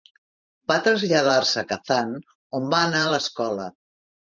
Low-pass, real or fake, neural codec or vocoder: 7.2 kHz; fake; vocoder, 44.1 kHz, 128 mel bands every 512 samples, BigVGAN v2